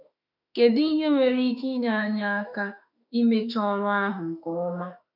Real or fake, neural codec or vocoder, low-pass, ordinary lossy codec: fake; autoencoder, 48 kHz, 32 numbers a frame, DAC-VAE, trained on Japanese speech; 5.4 kHz; none